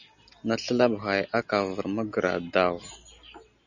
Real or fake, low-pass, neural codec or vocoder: real; 7.2 kHz; none